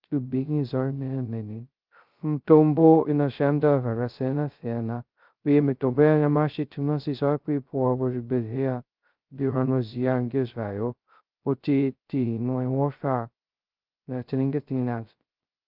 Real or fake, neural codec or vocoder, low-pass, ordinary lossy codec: fake; codec, 16 kHz, 0.2 kbps, FocalCodec; 5.4 kHz; Opus, 24 kbps